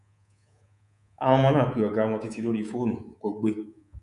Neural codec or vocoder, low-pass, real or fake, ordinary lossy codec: codec, 24 kHz, 3.1 kbps, DualCodec; 10.8 kHz; fake; none